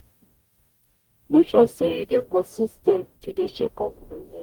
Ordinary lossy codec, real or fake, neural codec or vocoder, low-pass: Opus, 32 kbps; fake; codec, 44.1 kHz, 0.9 kbps, DAC; 19.8 kHz